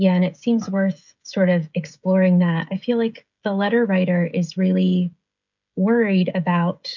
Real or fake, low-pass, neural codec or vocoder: fake; 7.2 kHz; codec, 16 kHz, 16 kbps, FreqCodec, smaller model